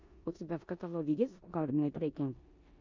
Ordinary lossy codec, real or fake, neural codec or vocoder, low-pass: MP3, 48 kbps; fake; codec, 16 kHz in and 24 kHz out, 0.9 kbps, LongCat-Audio-Codec, four codebook decoder; 7.2 kHz